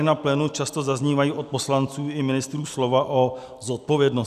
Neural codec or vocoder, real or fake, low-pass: none; real; 14.4 kHz